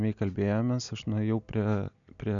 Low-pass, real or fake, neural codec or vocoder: 7.2 kHz; real; none